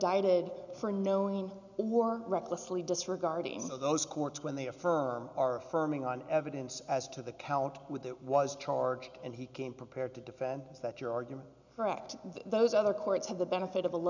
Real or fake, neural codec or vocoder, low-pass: real; none; 7.2 kHz